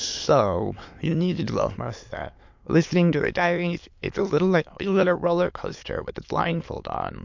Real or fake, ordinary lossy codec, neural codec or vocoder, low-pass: fake; MP3, 48 kbps; autoencoder, 22.05 kHz, a latent of 192 numbers a frame, VITS, trained on many speakers; 7.2 kHz